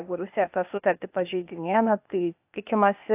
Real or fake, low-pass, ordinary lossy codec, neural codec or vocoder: fake; 3.6 kHz; AAC, 32 kbps; codec, 16 kHz, 0.8 kbps, ZipCodec